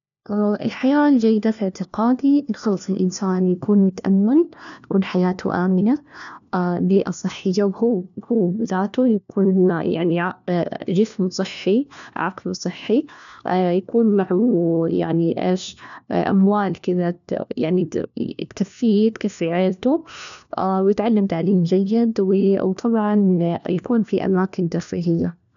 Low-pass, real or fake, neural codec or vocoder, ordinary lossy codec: 7.2 kHz; fake; codec, 16 kHz, 1 kbps, FunCodec, trained on LibriTTS, 50 frames a second; none